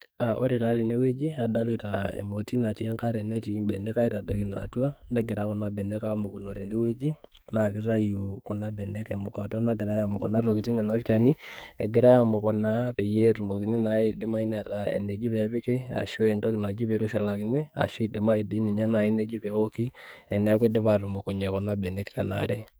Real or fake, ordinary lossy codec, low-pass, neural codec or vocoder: fake; none; none; codec, 44.1 kHz, 2.6 kbps, SNAC